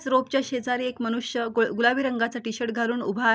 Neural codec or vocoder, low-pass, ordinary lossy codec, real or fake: none; none; none; real